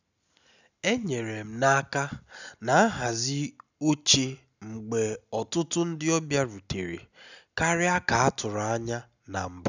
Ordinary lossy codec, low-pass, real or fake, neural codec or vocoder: none; 7.2 kHz; real; none